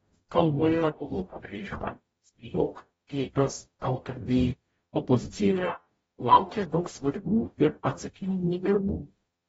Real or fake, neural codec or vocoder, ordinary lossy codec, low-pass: fake; codec, 44.1 kHz, 0.9 kbps, DAC; AAC, 24 kbps; 19.8 kHz